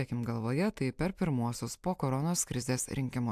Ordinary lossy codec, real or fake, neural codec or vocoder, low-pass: AAC, 96 kbps; real; none; 14.4 kHz